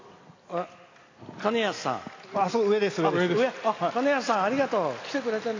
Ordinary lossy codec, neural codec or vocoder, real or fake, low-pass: AAC, 32 kbps; none; real; 7.2 kHz